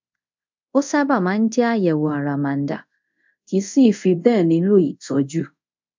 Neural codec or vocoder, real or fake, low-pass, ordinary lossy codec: codec, 24 kHz, 0.5 kbps, DualCodec; fake; 7.2 kHz; MP3, 64 kbps